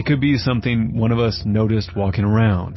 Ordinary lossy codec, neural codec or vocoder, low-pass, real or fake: MP3, 24 kbps; none; 7.2 kHz; real